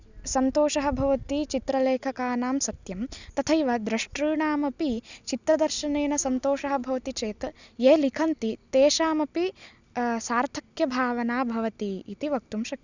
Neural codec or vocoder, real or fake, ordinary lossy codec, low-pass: none; real; none; 7.2 kHz